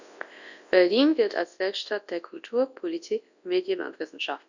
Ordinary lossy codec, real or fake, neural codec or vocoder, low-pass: none; fake; codec, 24 kHz, 0.9 kbps, WavTokenizer, large speech release; 7.2 kHz